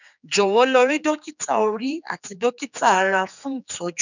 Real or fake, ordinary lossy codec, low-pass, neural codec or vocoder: fake; none; 7.2 kHz; codec, 16 kHz, 4 kbps, X-Codec, HuBERT features, trained on general audio